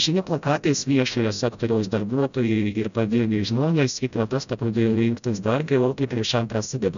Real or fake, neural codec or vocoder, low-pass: fake; codec, 16 kHz, 0.5 kbps, FreqCodec, smaller model; 7.2 kHz